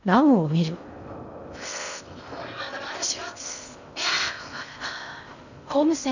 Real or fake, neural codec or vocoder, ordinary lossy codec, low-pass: fake; codec, 16 kHz in and 24 kHz out, 0.6 kbps, FocalCodec, streaming, 4096 codes; none; 7.2 kHz